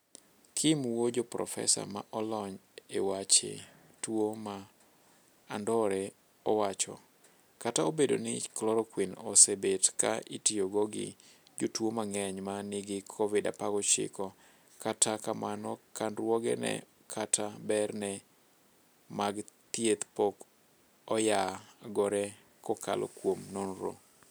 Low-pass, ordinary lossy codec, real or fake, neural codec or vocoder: none; none; real; none